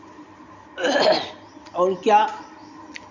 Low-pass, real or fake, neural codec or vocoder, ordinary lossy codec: 7.2 kHz; real; none; none